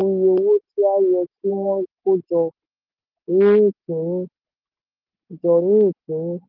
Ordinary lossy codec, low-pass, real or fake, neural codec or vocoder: Opus, 16 kbps; 5.4 kHz; real; none